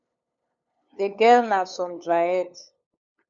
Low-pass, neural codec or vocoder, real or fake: 7.2 kHz; codec, 16 kHz, 8 kbps, FunCodec, trained on LibriTTS, 25 frames a second; fake